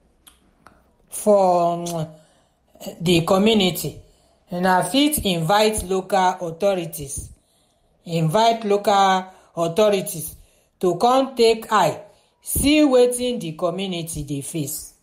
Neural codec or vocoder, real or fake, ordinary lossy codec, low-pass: none; real; MP3, 64 kbps; 19.8 kHz